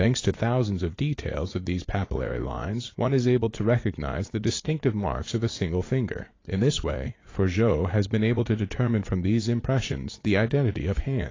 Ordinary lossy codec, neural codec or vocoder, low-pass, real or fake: AAC, 32 kbps; vocoder, 44.1 kHz, 80 mel bands, Vocos; 7.2 kHz; fake